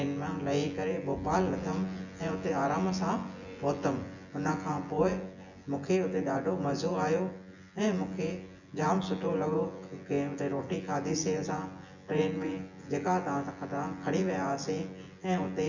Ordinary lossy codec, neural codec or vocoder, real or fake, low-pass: none; vocoder, 24 kHz, 100 mel bands, Vocos; fake; 7.2 kHz